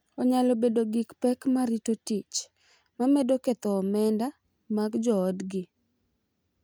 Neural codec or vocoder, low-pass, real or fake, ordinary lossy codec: none; none; real; none